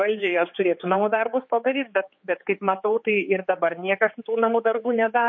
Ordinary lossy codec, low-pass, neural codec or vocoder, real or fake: MP3, 32 kbps; 7.2 kHz; codec, 16 kHz, 4 kbps, X-Codec, HuBERT features, trained on general audio; fake